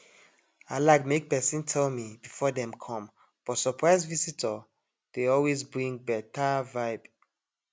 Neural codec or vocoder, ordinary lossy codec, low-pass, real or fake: none; none; none; real